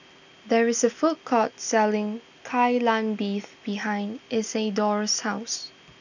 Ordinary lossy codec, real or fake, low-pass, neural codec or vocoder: none; real; 7.2 kHz; none